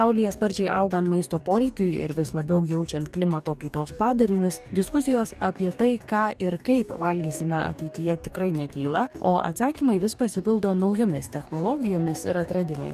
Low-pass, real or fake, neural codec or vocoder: 14.4 kHz; fake; codec, 44.1 kHz, 2.6 kbps, DAC